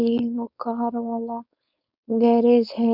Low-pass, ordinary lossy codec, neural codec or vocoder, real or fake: 5.4 kHz; none; codec, 16 kHz, 4.8 kbps, FACodec; fake